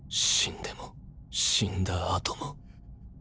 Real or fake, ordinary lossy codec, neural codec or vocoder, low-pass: real; none; none; none